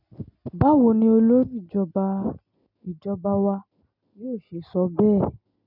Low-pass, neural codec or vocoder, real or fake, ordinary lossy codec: 5.4 kHz; none; real; none